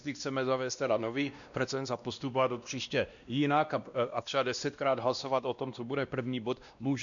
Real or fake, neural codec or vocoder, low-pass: fake; codec, 16 kHz, 1 kbps, X-Codec, WavLM features, trained on Multilingual LibriSpeech; 7.2 kHz